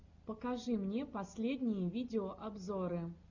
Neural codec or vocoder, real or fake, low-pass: none; real; 7.2 kHz